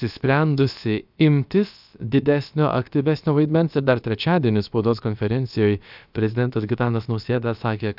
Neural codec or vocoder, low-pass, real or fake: codec, 16 kHz, about 1 kbps, DyCAST, with the encoder's durations; 5.4 kHz; fake